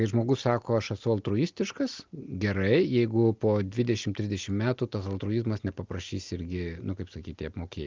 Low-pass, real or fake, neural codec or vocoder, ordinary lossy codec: 7.2 kHz; real; none; Opus, 32 kbps